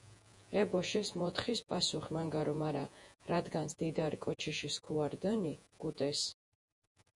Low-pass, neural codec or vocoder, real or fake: 10.8 kHz; vocoder, 48 kHz, 128 mel bands, Vocos; fake